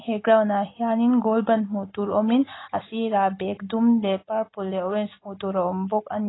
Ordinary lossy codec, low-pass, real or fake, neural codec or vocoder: AAC, 16 kbps; 7.2 kHz; real; none